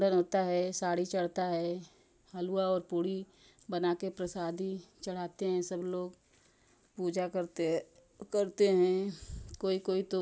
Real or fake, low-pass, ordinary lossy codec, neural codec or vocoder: real; none; none; none